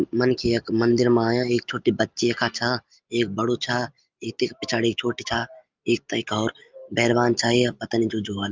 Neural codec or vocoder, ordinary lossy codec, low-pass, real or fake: none; Opus, 24 kbps; 7.2 kHz; real